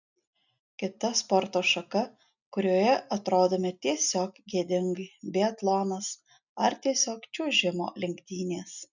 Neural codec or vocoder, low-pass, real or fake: none; 7.2 kHz; real